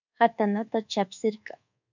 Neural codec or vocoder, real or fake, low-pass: codec, 24 kHz, 1.2 kbps, DualCodec; fake; 7.2 kHz